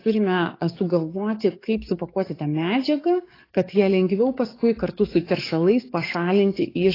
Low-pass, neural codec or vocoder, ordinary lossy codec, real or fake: 5.4 kHz; codec, 44.1 kHz, 7.8 kbps, DAC; AAC, 24 kbps; fake